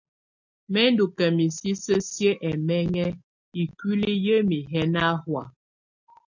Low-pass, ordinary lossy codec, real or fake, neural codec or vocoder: 7.2 kHz; MP3, 48 kbps; real; none